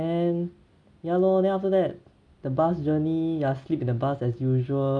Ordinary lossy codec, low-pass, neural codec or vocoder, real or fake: none; 9.9 kHz; none; real